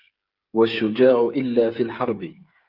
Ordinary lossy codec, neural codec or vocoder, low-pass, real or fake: Opus, 32 kbps; codec, 16 kHz, 8 kbps, FreqCodec, smaller model; 5.4 kHz; fake